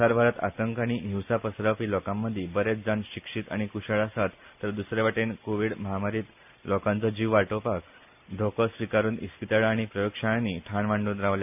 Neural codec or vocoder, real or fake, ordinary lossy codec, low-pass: none; real; none; 3.6 kHz